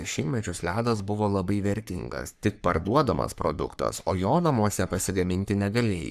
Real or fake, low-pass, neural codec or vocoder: fake; 14.4 kHz; codec, 44.1 kHz, 3.4 kbps, Pupu-Codec